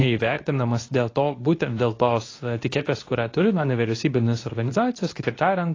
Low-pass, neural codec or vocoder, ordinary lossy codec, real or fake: 7.2 kHz; codec, 24 kHz, 0.9 kbps, WavTokenizer, medium speech release version 2; AAC, 32 kbps; fake